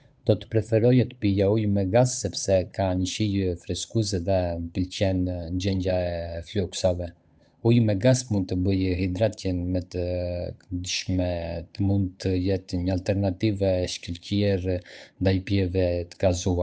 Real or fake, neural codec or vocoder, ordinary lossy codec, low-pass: fake; codec, 16 kHz, 8 kbps, FunCodec, trained on Chinese and English, 25 frames a second; none; none